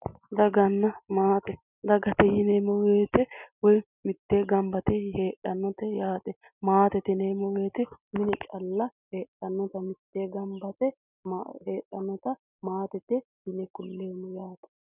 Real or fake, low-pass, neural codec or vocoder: real; 3.6 kHz; none